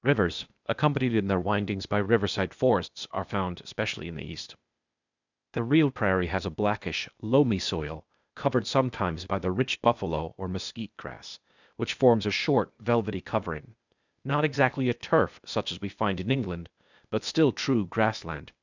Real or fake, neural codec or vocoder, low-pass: fake; codec, 16 kHz, 0.8 kbps, ZipCodec; 7.2 kHz